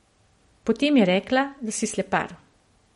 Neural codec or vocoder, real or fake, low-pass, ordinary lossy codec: none; real; 19.8 kHz; MP3, 48 kbps